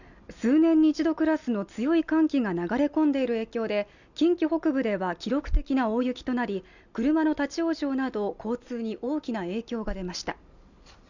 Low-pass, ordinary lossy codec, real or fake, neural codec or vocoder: 7.2 kHz; none; real; none